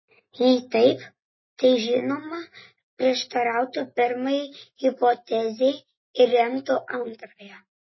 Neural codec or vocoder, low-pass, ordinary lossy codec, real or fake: none; 7.2 kHz; MP3, 24 kbps; real